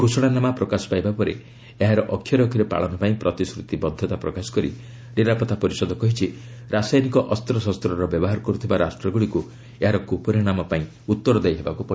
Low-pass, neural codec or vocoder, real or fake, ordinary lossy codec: none; none; real; none